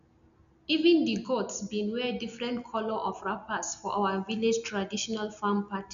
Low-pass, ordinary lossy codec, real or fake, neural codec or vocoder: 7.2 kHz; none; real; none